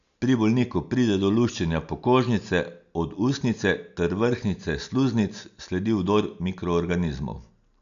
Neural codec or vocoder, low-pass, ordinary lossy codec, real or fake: none; 7.2 kHz; none; real